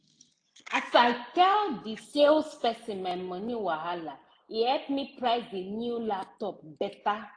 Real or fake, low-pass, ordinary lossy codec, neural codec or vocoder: real; 9.9 kHz; AAC, 48 kbps; none